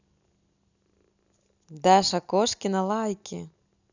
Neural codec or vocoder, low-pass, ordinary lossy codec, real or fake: none; 7.2 kHz; none; real